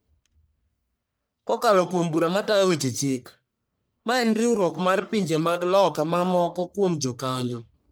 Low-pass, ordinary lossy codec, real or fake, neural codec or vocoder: none; none; fake; codec, 44.1 kHz, 1.7 kbps, Pupu-Codec